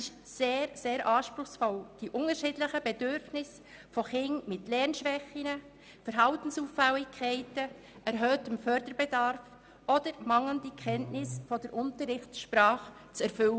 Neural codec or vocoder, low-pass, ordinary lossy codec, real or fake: none; none; none; real